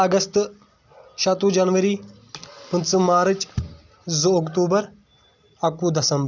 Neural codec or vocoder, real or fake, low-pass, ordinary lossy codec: none; real; 7.2 kHz; none